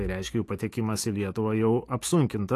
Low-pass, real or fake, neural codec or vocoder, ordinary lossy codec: 14.4 kHz; fake; autoencoder, 48 kHz, 128 numbers a frame, DAC-VAE, trained on Japanese speech; AAC, 48 kbps